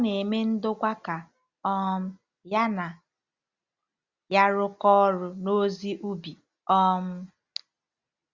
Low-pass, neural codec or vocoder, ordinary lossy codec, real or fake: 7.2 kHz; none; Opus, 64 kbps; real